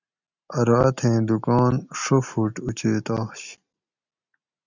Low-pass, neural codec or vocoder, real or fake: 7.2 kHz; none; real